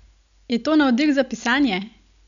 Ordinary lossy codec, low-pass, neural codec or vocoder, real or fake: MP3, 96 kbps; 7.2 kHz; none; real